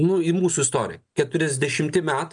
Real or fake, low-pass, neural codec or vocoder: real; 9.9 kHz; none